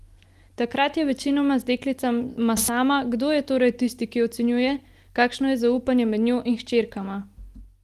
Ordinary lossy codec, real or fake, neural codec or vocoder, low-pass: Opus, 24 kbps; real; none; 14.4 kHz